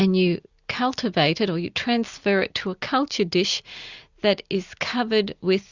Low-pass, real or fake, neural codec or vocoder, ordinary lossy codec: 7.2 kHz; real; none; Opus, 64 kbps